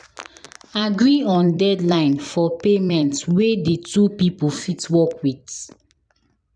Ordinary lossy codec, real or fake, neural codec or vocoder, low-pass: none; fake; vocoder, 44.1 kHz, 128 mel bands every 256 samples, BigVGAN v2; 9.9 kHz